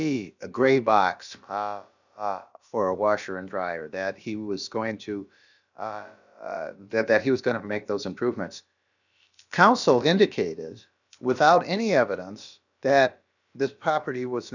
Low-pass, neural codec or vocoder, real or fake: 7.2 kHz; codec, 16 kHz, about 1 kbps, DyCAST, with the encoder's durations; fake